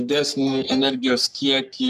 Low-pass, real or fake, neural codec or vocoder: 14.4 kHz; fake; codec, 44.1 kHz, 3.4 kbps, Pupu-Codec